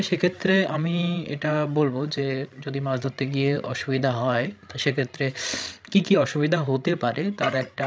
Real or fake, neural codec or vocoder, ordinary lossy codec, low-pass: fake; codec, 16 kHz, 16 kbps, FreqCodec, larger model; none; none